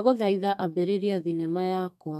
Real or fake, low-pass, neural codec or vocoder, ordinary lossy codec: fake; 14.4 kHz; codec, 32 kHz, 1.9 kbps, SNAC; none